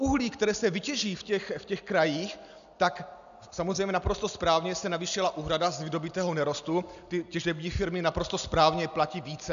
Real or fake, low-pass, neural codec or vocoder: real; 7.2 kHz; none